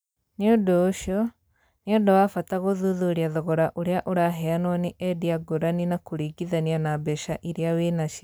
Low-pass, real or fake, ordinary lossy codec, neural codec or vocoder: none; real; none; none